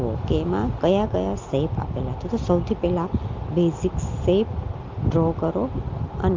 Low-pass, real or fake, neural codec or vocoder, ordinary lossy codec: 7.2 kHz; real; none; Opus, 32 kbps